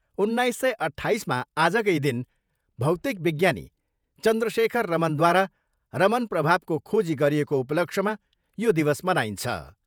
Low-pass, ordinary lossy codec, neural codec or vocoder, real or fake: none; none; vocoder, 48 kHz, 128 mel bands, Vocos; fake